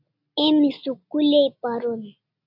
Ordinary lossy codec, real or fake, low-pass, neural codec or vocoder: AAC, 48 kbps; real; 5.4 kHz; none